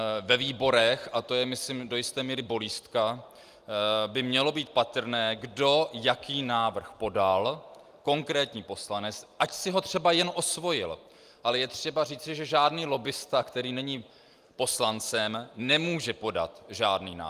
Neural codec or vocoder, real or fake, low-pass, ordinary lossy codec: none; real; 14.4 kHz; Opus, 32 kbps